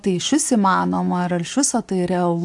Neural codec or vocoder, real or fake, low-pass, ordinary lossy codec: none; real; 10.8 kHz; AAC, 64 kbps